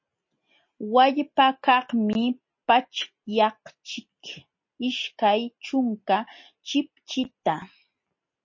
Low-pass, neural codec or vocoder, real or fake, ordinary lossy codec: 7.2 kHz; none; real; MP3, 32 kbps